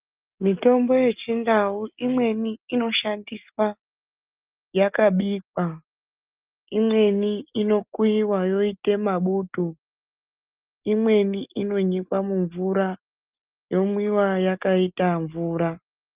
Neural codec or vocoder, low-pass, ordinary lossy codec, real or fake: none; 3.6 kHz; Opus, 24 kbps; real